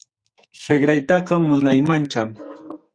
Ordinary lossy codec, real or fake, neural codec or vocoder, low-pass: Opus, 64 kbps; fake; codec, 44.1 kHz, 2.6 kbps, SNAC; 9.9 kHz